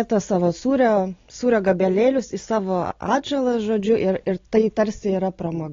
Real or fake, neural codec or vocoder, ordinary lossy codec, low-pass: real; none; AAC, 32 kbps; 7.2 kHz